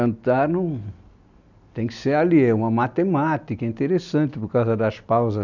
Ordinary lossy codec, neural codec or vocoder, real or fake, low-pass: none; none; real; 7.2 kHz